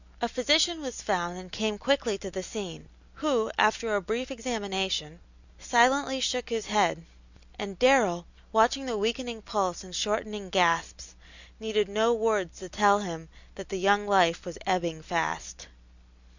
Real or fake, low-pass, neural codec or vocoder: real; 7.2 kHz; none